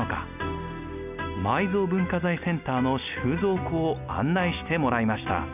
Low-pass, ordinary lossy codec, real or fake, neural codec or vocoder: 3.6 kHz; none; real; none